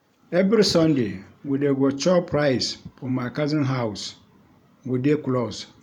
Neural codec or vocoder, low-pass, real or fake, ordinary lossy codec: vocoder, 44.1 kHz, 128 mel bands every 512 samples, BigVGAN v2; 19.8 kHz; fake; none